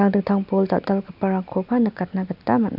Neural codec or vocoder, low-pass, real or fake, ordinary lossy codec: none; 5.4 kHz; real; none